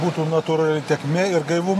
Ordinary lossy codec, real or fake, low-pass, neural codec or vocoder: AAC, 48 kbps; real; 14.4 kHz; none